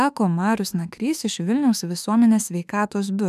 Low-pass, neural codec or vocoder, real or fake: 14.4 kHz; autoencoder, 48 kHz, 32 numbers a frame, DAC-VAE, trained on Japanese speech; fake